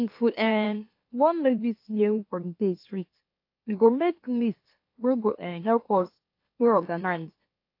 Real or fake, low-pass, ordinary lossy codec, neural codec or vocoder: fake; 5.4 kHz; AAC, 32 kbps; autoencoder, 44.1 kHz, a latent of 192 numbers a frame, MeloTTS